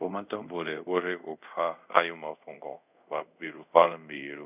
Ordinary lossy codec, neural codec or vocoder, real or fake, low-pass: none; codec, 24 kHz, 0.5 kbps, DualCodec; fake; 3.6 kHz